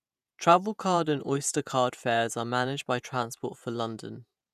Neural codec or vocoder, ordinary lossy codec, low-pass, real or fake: vocoder, 48 kHz, 128 mel bands, Vocos; none; 14.4 kHz; fake